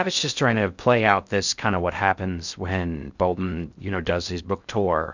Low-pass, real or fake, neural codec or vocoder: 7.2 kHz; fake; codec, 16 kHz in and 24 kHz out, 0.6 kbps, FocalCodec, streaming, 2048 codes